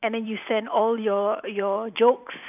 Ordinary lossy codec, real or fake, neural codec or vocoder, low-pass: none; fake; vocoder, 44.1 kHz, 128 mel bands every 512 samples, BigVGAN v2; 3.6 kHz